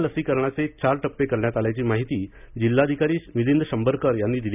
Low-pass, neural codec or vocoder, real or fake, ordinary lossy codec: 3.6 kHz; none; real; none